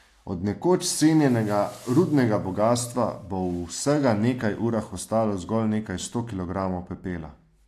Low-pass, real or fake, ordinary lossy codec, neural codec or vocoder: 14.4 kHz; real; AAC, 64 kbps; none